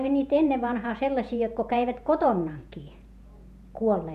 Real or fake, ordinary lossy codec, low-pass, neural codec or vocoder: fake; none; 14.4 kHz; vocoder, 48 kHz, 128 mel bands, Vocos